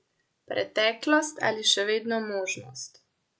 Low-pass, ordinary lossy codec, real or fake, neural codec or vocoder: none; none; real; none